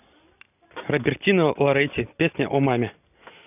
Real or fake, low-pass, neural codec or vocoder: real; 3.6 kHz; none